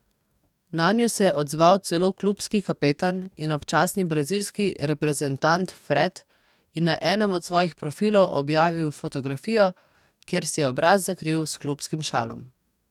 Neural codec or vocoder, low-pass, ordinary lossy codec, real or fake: codec, 44.1 kHz, 2.6 kbps, DAC; 19.8 kHz; none; fake